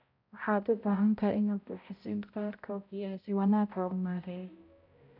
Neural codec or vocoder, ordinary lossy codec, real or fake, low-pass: codec, 16 kHz, 0.5 kbps, X-Codec, HuBERT features, trained on balanced general audio; none; fake; 5.4 kHz